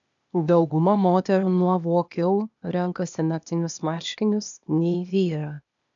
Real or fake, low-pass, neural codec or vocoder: fake; 7.2 kHz; codec, 16 kHz, 0.8 kbps, ZipCodec